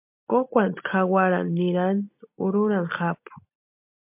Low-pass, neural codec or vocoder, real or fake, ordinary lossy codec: 3.6 kHz; none; real; MP3, 32 kbps